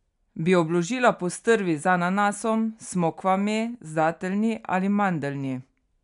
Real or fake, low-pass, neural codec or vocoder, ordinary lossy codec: real; 10.8 kHz; none; none